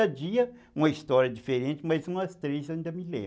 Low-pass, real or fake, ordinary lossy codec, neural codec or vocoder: none; real; none; none